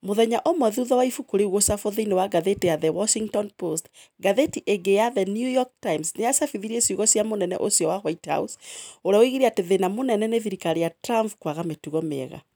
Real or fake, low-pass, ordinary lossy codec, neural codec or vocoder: real; none; none; none